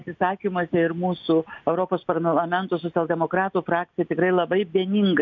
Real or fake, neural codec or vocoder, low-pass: real; none; 7.2 kHz